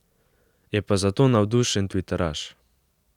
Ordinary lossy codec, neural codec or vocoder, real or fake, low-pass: none; none; real; 19.8 kHz